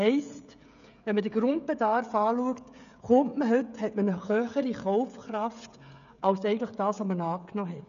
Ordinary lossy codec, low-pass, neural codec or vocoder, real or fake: none; 7.2 kHz; codec, 16 kHz, 16 kbps, FreqCodec, smaller model; fake